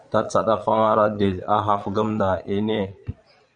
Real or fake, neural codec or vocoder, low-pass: fake; vocoder, 22.05 kHz, 80 mel bands, Vocos; 9.9 kHz